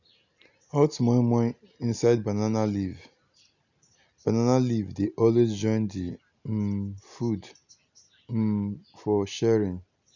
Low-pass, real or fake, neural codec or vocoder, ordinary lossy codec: 7.2 kHz; real; none; none